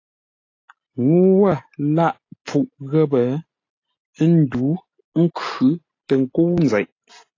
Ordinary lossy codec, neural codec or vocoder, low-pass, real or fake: AAC, 32 kbps; none; 7.2 kHz; real